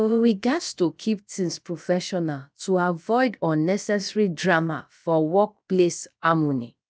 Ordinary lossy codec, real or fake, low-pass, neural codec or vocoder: none; fake; none; codec, 16 kHz, about 1 kbps, DyCAST, with the encoder's durations